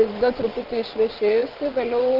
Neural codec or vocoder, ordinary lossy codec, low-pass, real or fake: vocoder, 22.05 kHz, 80 mel bands, WaveNeXt; Opus, 32 kbps; 5.4 kHz; fake